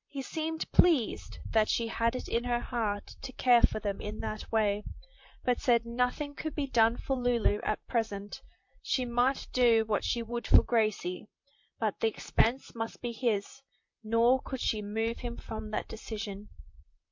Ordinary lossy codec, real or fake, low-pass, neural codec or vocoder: MP3, 48 kbps; fake; 7.2 kHz; vocoder, 44.1 kHz, 128 mel bands, Pupu-Vocoder